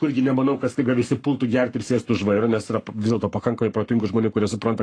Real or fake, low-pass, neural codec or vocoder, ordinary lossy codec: fake; 9.9 kHz; codec, 44.1 kHz, 7.8 kbps, Pupu-Codec; AAC, 48 kbps